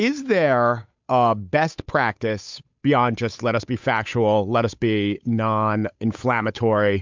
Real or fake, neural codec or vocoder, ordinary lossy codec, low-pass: fake; codec, 16 kHz, 8 kbps, FunCodec, trained on Chinese and English, 25 frames a second; MP3, 64 kbps; 7.2 kHz